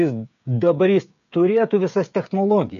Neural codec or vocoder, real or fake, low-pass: codec, 16 kHz, 16 kbps, FreqCodec, smaller model; fake; 7.2 kHz